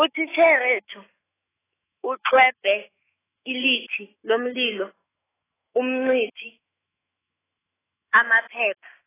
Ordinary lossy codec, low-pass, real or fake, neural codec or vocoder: AAC, 16 kbps; 3.6 kHz; fake; autoencoder, 48 kHz, 128 numbers a frame, DAC-VAE, trained on Japanese speech